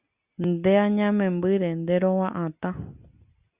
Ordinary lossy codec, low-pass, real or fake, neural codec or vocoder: Opus, 64 kbps; 3.6 kHz; real; none